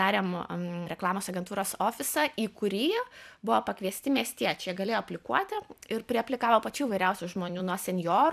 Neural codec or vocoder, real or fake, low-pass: vocoder, 44.1 kHz, 128 mel bands every 256 samples, BigVGAN v2; fake; 14.4 kHz